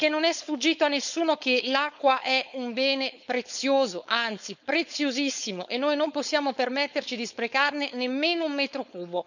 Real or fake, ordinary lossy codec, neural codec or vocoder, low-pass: fake; none; codec, 16 kHz, 4.8 kbps, FACodec; 7.2 kHz